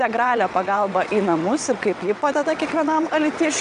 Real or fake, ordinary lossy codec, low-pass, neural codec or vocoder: fake; MP3, 96 kbps; 9.9 kHz; vocoder, 22.05 kHz, 80 mel bands, WaveNeXt